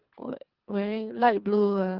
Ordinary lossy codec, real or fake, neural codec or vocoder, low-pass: Opus, 16 kbps; fake; codec, 24 kHz, 3 kbps, HILCodec; 5.4 kHz